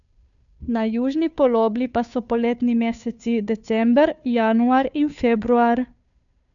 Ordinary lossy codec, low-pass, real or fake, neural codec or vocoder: none; 7.2 kHz; fake; codec, 16 kHz, 2 kbps, FunCodec, trained on Chinese and English, 25 frames a second